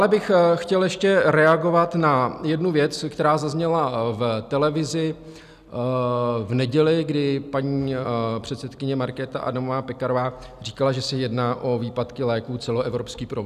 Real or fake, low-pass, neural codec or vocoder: real; 14.4 kHz; none